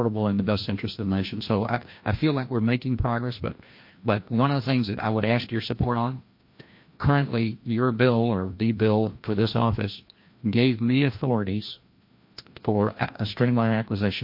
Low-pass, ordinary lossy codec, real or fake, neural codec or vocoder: 5.4 kHz; MP3, 32 kbps; fake; codec, 16 kHz, 1 kbps, FreqCodec, larger model